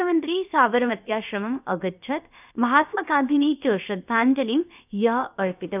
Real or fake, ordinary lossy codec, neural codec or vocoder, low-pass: fake; none; codec, 16 kHz, about 1 kbps, DyCAST, with the encoder's durations; 3.6 kHz